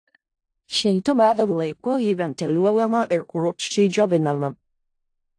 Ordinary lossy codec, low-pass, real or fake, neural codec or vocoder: AAC, 48 kbps; 9.9 kHz; fake; codec, 16 kHz in and 24 kHz out, 0.4 kbps, LongCat-Audio-Codec, four codebook decoder